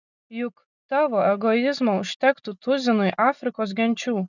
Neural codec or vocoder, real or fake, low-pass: none; real; 7.2 kHz